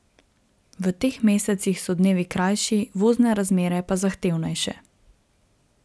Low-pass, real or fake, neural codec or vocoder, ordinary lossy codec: none; real; none; none